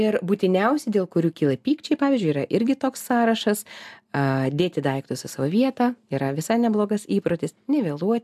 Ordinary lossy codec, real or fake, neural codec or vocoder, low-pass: AAC, 96 kbps; real; none; 14.4 kHz